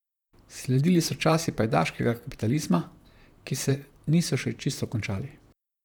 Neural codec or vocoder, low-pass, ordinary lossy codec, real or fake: vocoder, 44.1 kHz, 128 mel bands, Pupu-Vocoder; 19.8 kHz; none; fake